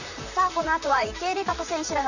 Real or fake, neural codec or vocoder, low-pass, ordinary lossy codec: fake; vocoder, 44.1 kHz, 128 mel bands, Pupu-Vocoder; 7.2 kHz; none